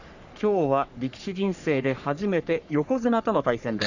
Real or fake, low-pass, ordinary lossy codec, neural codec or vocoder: fake; 7.2 kHz; none; codec, 44.1 kHz, 3.4 kbps, Pupu-Codec